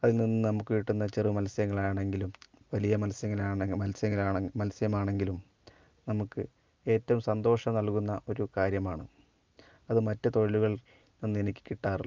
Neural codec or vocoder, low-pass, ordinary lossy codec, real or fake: none; 7.2 kHz; Opus, 24 kbps; real